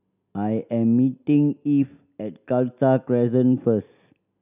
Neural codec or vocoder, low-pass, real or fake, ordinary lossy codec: none; 3.6 kHz; real; none